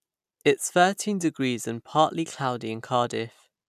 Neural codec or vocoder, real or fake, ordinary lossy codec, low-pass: none; real; none; 14.4 kHz